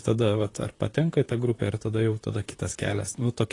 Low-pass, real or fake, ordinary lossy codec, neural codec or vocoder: 10.8 kHz; fake; AAC, 32 kbps; vocoder, 44.1 kHz, 128 mel bands, Pupu-Vocoder